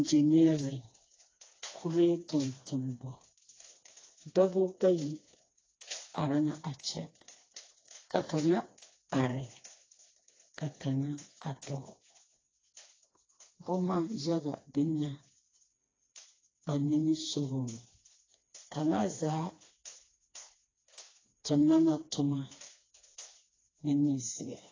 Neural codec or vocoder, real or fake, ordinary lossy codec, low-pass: codec, 16 kHz, 2 kbps, FreqCodec, smaller model; fake; AAC, 32 kbps; 7.2 kHz